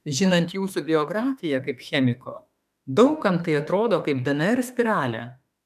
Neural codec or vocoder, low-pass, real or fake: autoencoder, 48 kHz, 32 numbers a frame, DAC-VAE, trained on Japanese speech; 14.4 kHz; fake